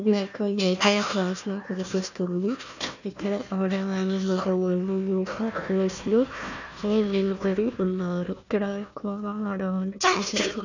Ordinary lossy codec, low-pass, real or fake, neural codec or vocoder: none; 7.2 kHz; fake; codec, 16 kHz, 1 kbps, FunCodec, trained on Chinese and English, 50 frames a second